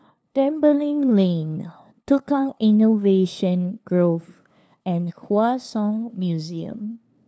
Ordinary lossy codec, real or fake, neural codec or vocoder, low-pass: none; fake; codec, 16 kHz, 2 kbps, FunCodec, trained on LibriTTS, 25 frames a second; none